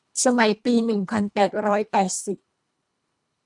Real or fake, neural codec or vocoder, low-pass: fake; codec, 24 kHz, 1.5 kbps, HILCodec; 10.8 kHz